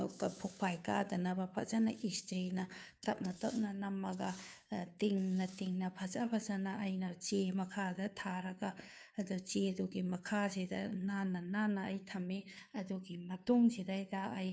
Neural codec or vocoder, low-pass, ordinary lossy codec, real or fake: codec, 16 kHz, 2 kbps, FunCodec, trained on Chinese and English, 25 frames a second; none; none; fake